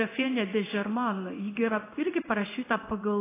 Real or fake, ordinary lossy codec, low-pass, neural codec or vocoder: fake; AAC, 24 kbps; 3.6 kHz; codec, 16 kHz in and 24 kHz out, 1 kbps, XY-Tokenizer